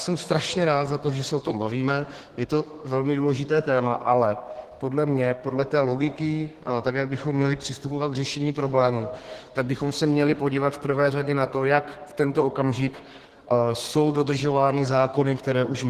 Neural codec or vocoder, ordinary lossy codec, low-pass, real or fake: codec, 32 kHz, 1.9 kbps, SNAC; Opus, 16 kbps; 14.4 kHz; fake